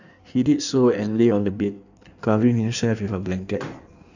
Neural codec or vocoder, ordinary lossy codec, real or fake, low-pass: codec, 16 kHz in and 24 kHz out, 1.1 kbps, FireRedTTS-2 codec; none; fake; 7.2 kHz